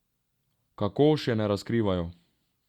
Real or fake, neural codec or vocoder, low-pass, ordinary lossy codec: real; none; 19.8 kHz; Opus, 64 kbps